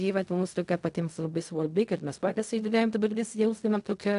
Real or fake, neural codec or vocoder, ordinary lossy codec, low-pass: fake; codec, 16 kHz in and 24 kHz out, 0.4 kbps, LongCat-Audio-Codec, fine tuned four codebook decoder; AAC, 96 kbps; 10.8 kHz